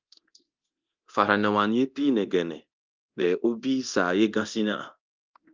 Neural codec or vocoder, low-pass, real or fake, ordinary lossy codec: codec, 24 kHz, 0.9 kbps, DualCodec; 7.2 kHz; fake; Opus, 32 kbps